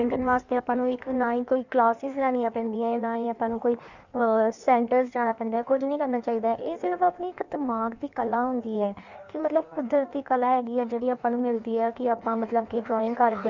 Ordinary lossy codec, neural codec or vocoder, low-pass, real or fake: none; codec, 16 kHz in and 24 kHz out, 1.1 kbps, FireRedTTS-2 codec; 7.2 kHz; fake